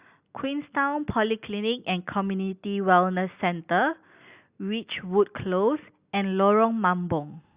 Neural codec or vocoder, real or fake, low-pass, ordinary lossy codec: none; real; 3.6 kHz; Opus, 64 kbps